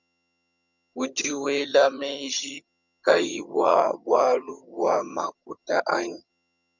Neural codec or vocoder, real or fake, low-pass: vocoder, 22.05 kHz, 80 mel bands, HiFi-GAN; fake; 7.2 kHz